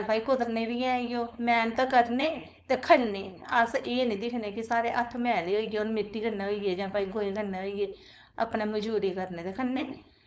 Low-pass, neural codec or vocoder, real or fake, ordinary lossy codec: none; codec, 16 kHz, 4.8 kbps, FACodec; fake; none